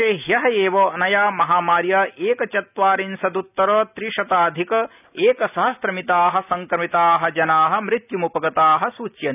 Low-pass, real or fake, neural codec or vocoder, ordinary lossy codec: 3.6 kHz; real; none; none